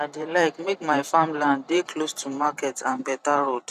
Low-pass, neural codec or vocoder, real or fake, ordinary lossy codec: 14.4 kHz; vocoder, 44.1 kHz, 128 mel bands every 512 samples, BigVGAN v2; fake; none